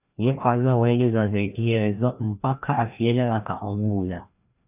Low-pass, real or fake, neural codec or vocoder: 3.6 kHz; fake; codec, 16 kHz, 1 kbps, FreqCodec, larger model